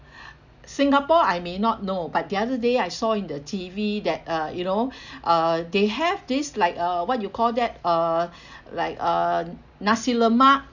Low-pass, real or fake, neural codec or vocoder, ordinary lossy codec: 7.2 kHz; real; none; none